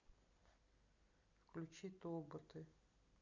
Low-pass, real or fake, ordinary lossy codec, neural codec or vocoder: 7.2 kHz; real; none; none